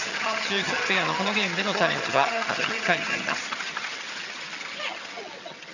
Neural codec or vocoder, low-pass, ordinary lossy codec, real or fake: vocoder, 22.05 kHz, 80 mel bands, HiFi-GAN; 7.2 kHz; none; fake